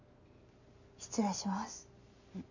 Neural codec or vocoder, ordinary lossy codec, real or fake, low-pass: none; none; real; 7.2 kHz